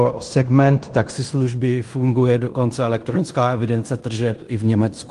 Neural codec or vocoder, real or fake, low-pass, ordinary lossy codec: codec, 16 kHz in and 24 kHz out, 0.9 kbps, LongCat-Audio-Codec, fine tuned four codebook decoder; fake; 10.8 kHz; Opus, 24 kbps